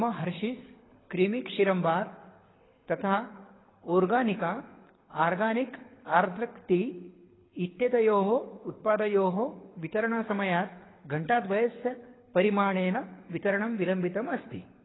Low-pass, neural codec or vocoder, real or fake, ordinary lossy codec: 7.2 kHz; codec, 24 kHz, 6 kbps, HILCodec; fake; AAC, 16 kbps